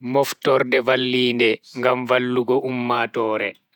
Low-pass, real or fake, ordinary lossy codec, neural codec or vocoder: 19.8 kHz; fake; none; vocoder, 48 kHz, 128 mel bands, Vocos